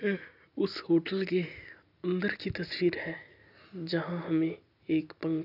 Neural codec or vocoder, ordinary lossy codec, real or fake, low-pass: none; none; real; 5.4 kHz